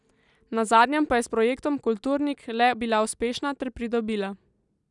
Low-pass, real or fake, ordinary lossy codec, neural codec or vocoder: 10.8 kHz; real; none; none